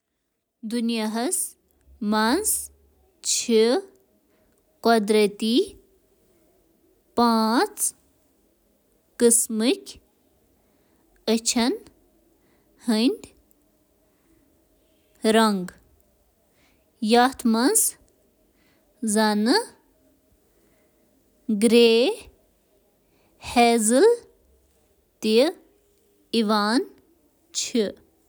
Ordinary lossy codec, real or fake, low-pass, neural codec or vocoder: none; real; none; none